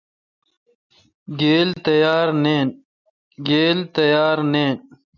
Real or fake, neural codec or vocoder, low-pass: real; none; 7.2 kHz